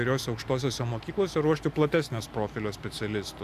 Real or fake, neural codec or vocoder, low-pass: real; none; 14.4 kHz